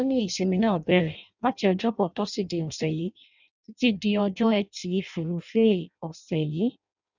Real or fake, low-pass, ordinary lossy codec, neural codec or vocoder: fake; 7.2 kHz; none; codec, 16 kHz in and 24 kHz out, 0.6 kbps, FireRedTTS-2 codec